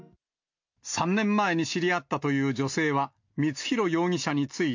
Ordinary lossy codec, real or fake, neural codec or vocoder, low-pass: MP3, 48 kbps; real; none; 7.2 kHz